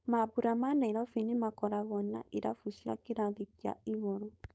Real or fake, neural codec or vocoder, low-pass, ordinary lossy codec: fake; codec, 16 kHz, 4.8 kbps, FACodec; none; none